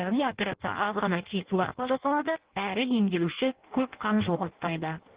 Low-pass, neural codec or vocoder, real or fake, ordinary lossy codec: 3.6 kHz; codec, 16 kHz in and 24 kHz out, 0.6 kbps, FireRedTTS-2 codec; fake; Opus, 16 kbps